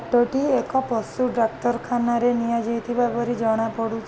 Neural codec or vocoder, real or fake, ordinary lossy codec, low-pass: none; real; none; none